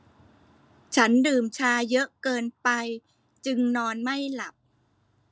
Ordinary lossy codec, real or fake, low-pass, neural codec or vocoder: none; real; none; none